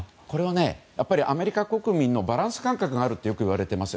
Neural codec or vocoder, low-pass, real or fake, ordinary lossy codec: none; none; real; none